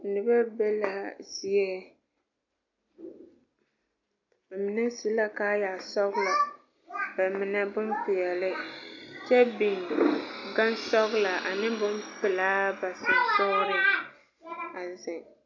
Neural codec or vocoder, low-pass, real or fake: none; 7.2 kHz; real